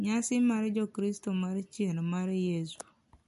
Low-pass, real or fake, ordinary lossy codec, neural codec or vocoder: 14.4 kHz; real; MP3, 48 kbps; none